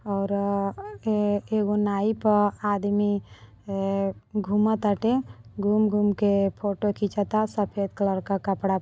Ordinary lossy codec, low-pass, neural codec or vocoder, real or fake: none; none; none; real